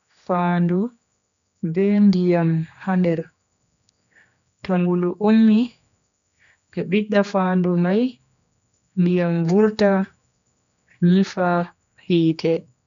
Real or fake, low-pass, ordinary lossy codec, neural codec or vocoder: fake; 7.2 kHz; none; codec, 16 kHz, 2 kbps, X-Codec, HuBERT features, trained on general audio